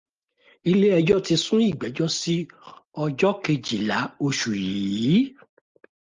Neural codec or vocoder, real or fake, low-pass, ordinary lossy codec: none; real; 7.2 kHz; Opus, 32 kbps